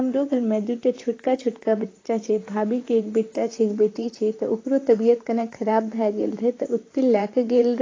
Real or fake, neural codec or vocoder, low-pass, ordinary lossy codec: fake; vocoder, 44.1 kHz, 128 mel bands, Pupu-Vocoder; 7.2 kHz; AAC, 32 kbps